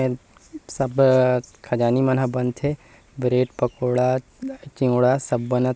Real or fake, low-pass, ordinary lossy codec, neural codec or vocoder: real; none; none; none